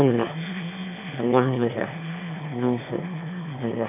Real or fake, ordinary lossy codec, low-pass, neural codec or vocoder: fake; none; 3.6 kHz; autoencoder, 22.05 kHz, a latent of 192 numbers a frame, VITS, trained on one speaker